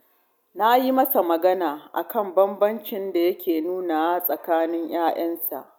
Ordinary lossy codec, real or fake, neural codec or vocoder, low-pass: none; real; none; none